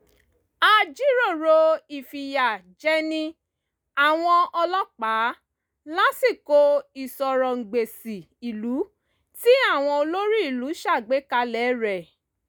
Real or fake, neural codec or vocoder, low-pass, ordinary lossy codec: real; none; none; none